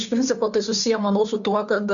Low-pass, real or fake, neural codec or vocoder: 7.2 kHz; fake; codec, 16 kHz, 2 kbps, FunCodec, trained on Chinese and English, 25 frames a second